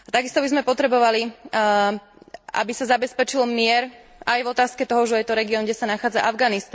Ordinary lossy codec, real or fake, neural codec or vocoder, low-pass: none; real; none; none